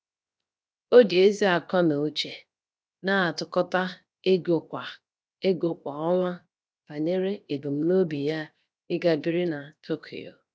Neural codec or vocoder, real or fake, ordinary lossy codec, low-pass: codec, 16 kHz, 0.7 kbps, FocalCodec; fake; none; none